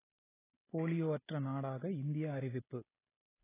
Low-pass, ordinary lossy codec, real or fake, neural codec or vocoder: 3.6 kHz; AAC, 16 kbps; fake; vocoder, 44.1 kHz, 128 mel bands every 256 samples, BigVGAN v2